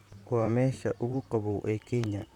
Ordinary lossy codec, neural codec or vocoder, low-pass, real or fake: none; vocoder, 44.1 kHz, 128 mel bands, Pupu-Vocoder; 19.8 kHz; fake